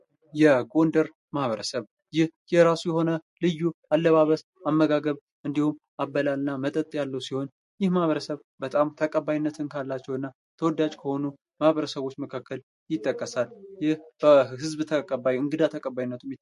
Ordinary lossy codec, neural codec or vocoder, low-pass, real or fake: MP3, 48 kbps; none; 14.4 kHz; real